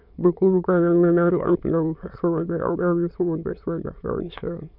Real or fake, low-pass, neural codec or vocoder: fake; 5.4 kHz; autoencoder, 22.05 kHz, a latent of 192 numbers a frame, VITS, trained on many speakers